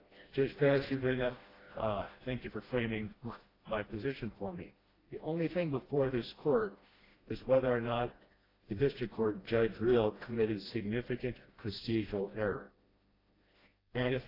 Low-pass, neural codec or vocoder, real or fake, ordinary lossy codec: 5.4 kHz; codec, 16 kHz, 1 kbps, FreqCodec, smaller model; fake; AAC, 24 kbps